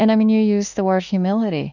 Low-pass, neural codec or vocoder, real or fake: 7.2 kHz; autoencoder, 48 kHz, 32 numbers a frame, DAC-VAE, trained on Japanese speech; fake